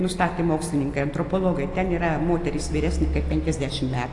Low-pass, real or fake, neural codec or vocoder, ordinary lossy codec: 10.8 kHz; real; none; AAC, 48 kbps